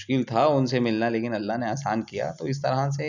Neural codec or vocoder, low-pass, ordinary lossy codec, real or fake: none; 7.2 kHz; none; real